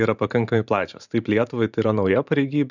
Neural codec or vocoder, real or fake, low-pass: vocoder, 44.1 kHz, 128 mel bands every 512 samples, BigVGAN v2; fake; 7.2 kHz